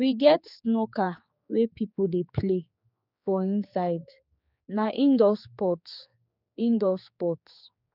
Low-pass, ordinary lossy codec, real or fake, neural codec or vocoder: 5.4 kHz; none; fake; codec, 16 kHz, 4 kbps, X-Codec, HuBERT features, trained on general audio